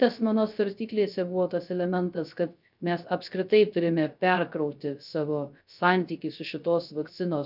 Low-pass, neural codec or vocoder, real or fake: 5.4 kHz; codec, 16 kHz, 0.3 kbps, FocalCodec; fake